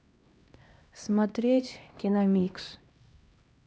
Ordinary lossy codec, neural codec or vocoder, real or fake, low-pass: none; codec, 16 kHz, 1 kbps, X-Codec, HuBERT features, trained on LibriSpeech; fake; none